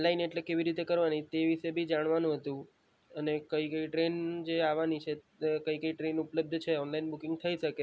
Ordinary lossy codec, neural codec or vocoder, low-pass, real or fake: none; none; none; real